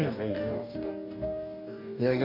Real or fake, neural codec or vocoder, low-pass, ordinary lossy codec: fake; codec, 44.1 kHz, 2.6 kbps, DAC; 5.4 kHz; MP3, 32 kbps